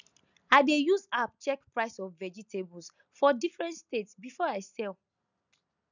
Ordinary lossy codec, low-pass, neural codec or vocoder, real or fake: none; 7.2 kHz; none; real